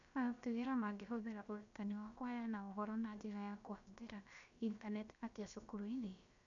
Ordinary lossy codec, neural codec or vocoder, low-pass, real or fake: none; codec, 16 kHz, about 1 kbps, DyCAST, with the encoder's durations; 7.2 kHz; fake